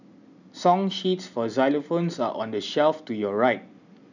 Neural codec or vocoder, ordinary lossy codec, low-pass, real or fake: none; none; 7.2 kHz; real